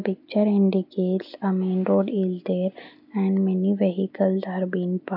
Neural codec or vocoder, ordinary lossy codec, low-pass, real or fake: none; none; 5.4 kHz; real